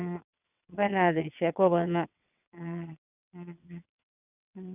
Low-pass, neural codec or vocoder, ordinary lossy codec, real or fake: 3.6 kHz; vocoder, 22.05 kHz, 80 mel bands, WaveNeXt; none; fake